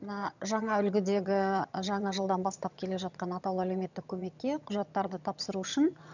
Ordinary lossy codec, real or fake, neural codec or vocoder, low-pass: none; fake; vocoder, 22.05 kHz, 80 mel bands, HiFi-GAN; 7.2 kHz